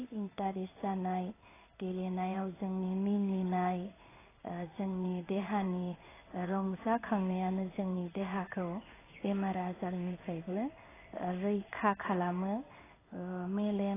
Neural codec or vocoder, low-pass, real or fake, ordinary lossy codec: codec, 16 kHz in and 24 kHz out, 1 kbps, XY-Tokenizer; 3.6 kHz; fake; AAC, 16 kbps